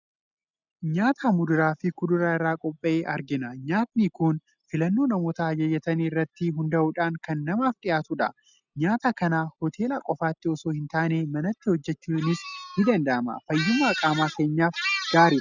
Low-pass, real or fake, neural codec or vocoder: 7.2 kHz; real; none